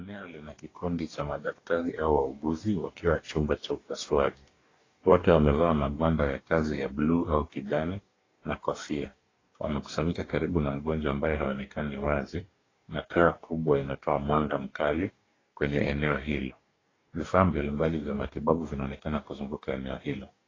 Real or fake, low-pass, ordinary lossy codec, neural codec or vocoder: fake; 7.2 kHz; AAC, 32 kbps; codec, 44.1 kHz, 2.6 kbps, DAC